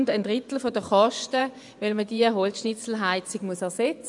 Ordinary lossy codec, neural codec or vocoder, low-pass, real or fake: none; vocoder, 24 kHz, 100 mel bands, Vocos; 10.8 kHz; fake